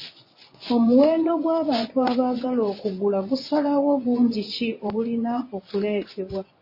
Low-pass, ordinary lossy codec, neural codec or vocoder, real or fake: 5.4 kHz; MP3, 24 kbps; vocoder, 24 kHz, 100 mel bands, Vocos; fake